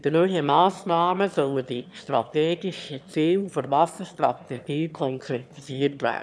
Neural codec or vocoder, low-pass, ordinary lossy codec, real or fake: autoencoder, 22.05 kHz, a latent of 192 numbers a frame, VITS, trained on one speaker; none; none; fake